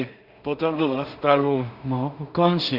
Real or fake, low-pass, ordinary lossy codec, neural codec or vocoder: fake; 5.4 kHz; Opus, 64 kbps; codec, 16 kHz in and 24 kHz out, 0.4 kbps, LongCat-Audio-Codec, two codebook decoder